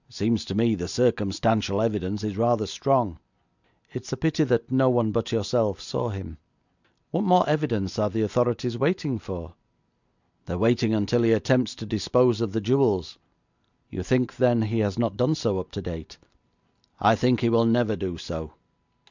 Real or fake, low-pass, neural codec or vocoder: real; 7.2 kHz; none